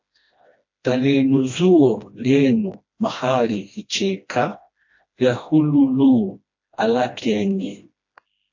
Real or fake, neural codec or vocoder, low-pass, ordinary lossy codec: fake; codec, 16 kHz, 1 kbps, FreqCodec, smaller model; 7.2 kHz; AAC, 48 kbps